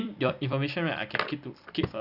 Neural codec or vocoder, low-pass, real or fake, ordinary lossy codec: vocoder, 44.1 kHz, 128 mel bands every 256 samples, BigVGAN v2; 5.4 kHz; fake; Opus, 64 kbps